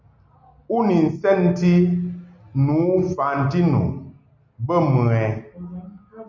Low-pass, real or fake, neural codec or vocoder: 7.2 kHz; real; none